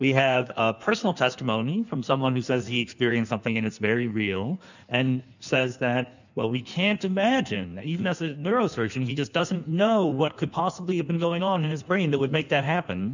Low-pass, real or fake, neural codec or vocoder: 7.2 kHz; fake; codec, 16 kHz in and 24 kHz out, 1.1 kbps, FireRedTTS-2 codec